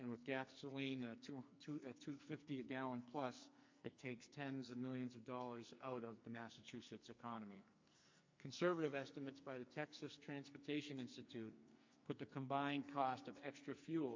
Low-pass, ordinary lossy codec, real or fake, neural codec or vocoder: 7.2 kHz; MP3, 48 kbps; fake; codec, 44.1 kHz, 2.6 kbps, SNAC